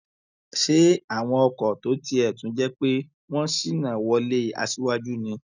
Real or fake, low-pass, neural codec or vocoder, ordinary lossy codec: real; 7.2 kHz; none; none